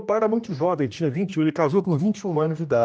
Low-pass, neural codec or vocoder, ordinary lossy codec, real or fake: none; codec, 16 kHz, 1 kbps, X-Codec, HuBERT features, trained on general audio; none; fake